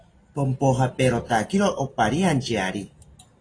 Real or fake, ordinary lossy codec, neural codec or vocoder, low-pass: real; AAC, 32 kbps; none; 9.9 kHz